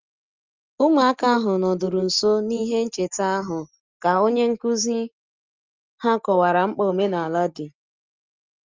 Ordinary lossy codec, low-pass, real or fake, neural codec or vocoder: Opus, 32 kbps; 7.2 kHz; real; none